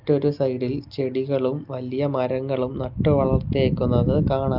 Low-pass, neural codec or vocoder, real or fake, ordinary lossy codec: 5.4 kHz; none; real; Opus, 24 kbps